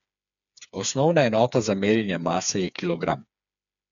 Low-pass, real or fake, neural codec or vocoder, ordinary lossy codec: 7.2 kHz; fake; codec, 16 kHz, 4 kbps, FreqCodec, smaller model; none